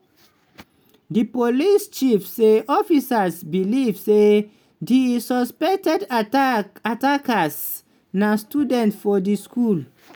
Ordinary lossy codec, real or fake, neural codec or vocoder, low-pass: none; real; none; none